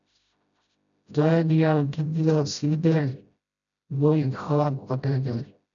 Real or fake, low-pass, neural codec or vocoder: fake; 7.2 kHz; codec, 16 kHz, 0.5 kbps, FreqCodec, smaller model